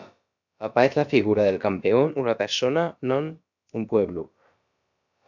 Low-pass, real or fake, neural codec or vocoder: 7.2 kHz; fake; codec, 16 kHz, about 1 kbps, DyCAST, with the encoder's durations